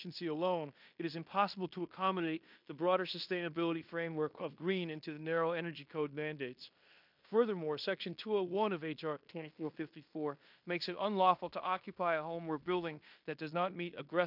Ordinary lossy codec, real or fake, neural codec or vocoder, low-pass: MP3, 48 kbps; fake; codec, 16 kHz in and 24 kHz out, 0.9 kbps, LongCat-Audio-Codec, fine tuned four codebook decoder; 5.4 kHz